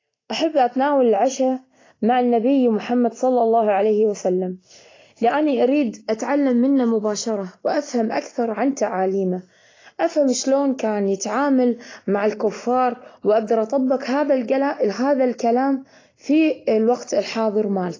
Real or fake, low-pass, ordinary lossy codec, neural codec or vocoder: real; 7.2 kHz; AAC, 32 kbps; none